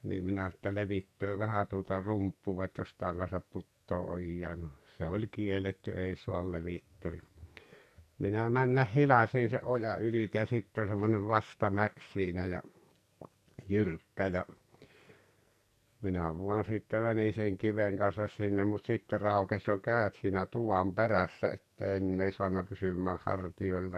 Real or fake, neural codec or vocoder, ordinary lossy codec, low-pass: fake; codec, 44.1 kHz, 2.6 kbps, SNAC; none; 14.4 kHz